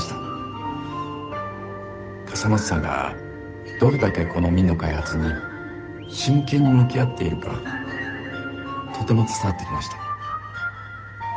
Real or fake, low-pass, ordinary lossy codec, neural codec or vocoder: fake; none; none; codec, 16 kHz, 8 kbps, FunCodec, trained on Chinese and English, 25 frames a second